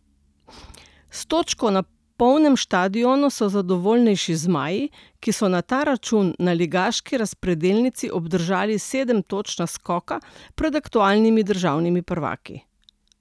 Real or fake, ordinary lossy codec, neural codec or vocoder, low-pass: real; none; none; none